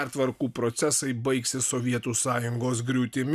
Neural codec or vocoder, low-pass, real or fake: none; 14.4 kHz; real